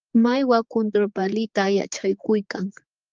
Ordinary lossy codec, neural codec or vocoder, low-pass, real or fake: Opus, 24 kbps; codec, 16 kHz, 4 kbps, X-Codec, HuBERT features, trained on general audio; 7.2 kHz; fake